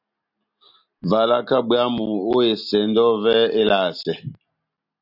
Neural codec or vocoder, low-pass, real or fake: none; 5.4 kHz; real